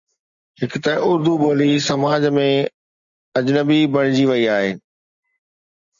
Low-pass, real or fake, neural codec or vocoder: 7.2 kHz; real; none